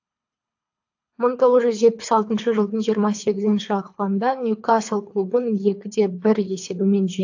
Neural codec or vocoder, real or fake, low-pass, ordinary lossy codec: codec, 24 kHz, 3 kbps, HILCodec; fake; 7.2 kHz; AAC, 48 kbps